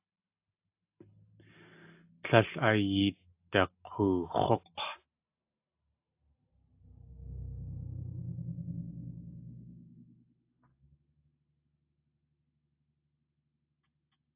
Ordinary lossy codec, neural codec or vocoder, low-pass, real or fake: AAC, 32 kbps; none; 3.6 kHz; real